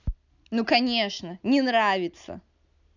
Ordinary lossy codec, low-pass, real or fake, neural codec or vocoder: none; 7.2 kHz; real; none